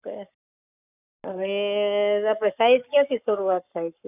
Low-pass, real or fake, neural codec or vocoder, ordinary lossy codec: 3.6 kHz; fake; codec, 44.1 kHz, 7.8 kbps, Pupu-Codec; none